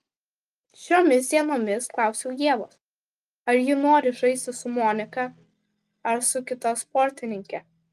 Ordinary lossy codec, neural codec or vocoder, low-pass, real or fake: Opus, 32 kbps; none; 14.4 kHz; real